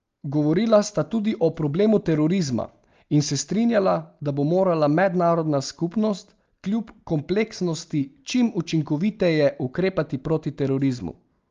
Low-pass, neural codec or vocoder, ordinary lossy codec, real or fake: 7.2 kHz; none; Opus, 32 kbps; real